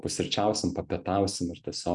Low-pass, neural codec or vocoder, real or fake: 10.8 kHz; none; real